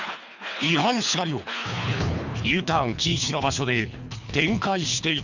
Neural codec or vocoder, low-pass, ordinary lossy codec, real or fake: codec, 24 kHz, 3 kbps, HILCodec; 7.2 kHz; none; fake